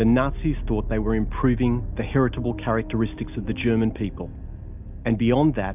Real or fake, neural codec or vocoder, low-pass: real; none; 3.6 kHz